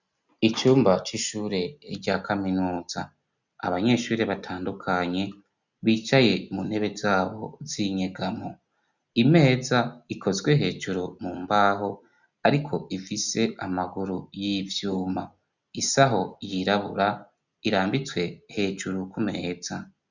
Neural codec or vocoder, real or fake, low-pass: none; real; 7.2 kHz